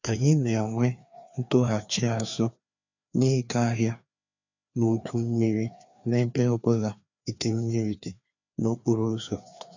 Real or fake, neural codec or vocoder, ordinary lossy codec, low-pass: fake; codec, 16 kHz, 2 kbps, FreqCodec, larger model; none; 7.2 kHz